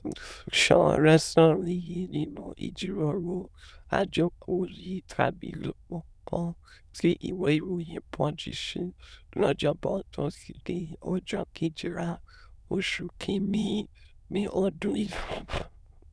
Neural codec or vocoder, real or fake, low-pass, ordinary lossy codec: autoencoder, 22.05 kHz, a latent of 192 numbers a frame, VITS, trained on many speakers; fake; none; none